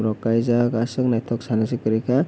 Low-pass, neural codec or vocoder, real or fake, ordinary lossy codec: none; none; real; none